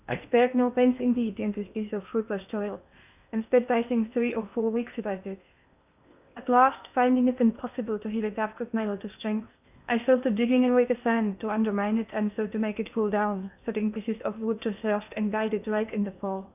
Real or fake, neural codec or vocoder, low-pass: fake; codec, 16 kHz in and 24 kHz out, 0.8 kbps, FocalCodec, streaming, 65536 codes; 3.6 kHz